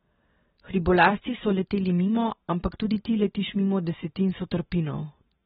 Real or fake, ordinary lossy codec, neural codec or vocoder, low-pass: real; AAC, 16 kbps; none; 7.2 kHz